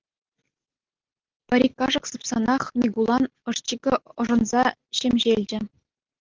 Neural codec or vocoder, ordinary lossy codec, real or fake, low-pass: none; Opus, 16 kbps; real; 7.2 kHz